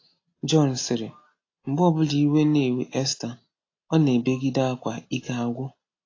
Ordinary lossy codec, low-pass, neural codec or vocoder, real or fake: AAC, 32 kbps; 7.2 kHz; none; real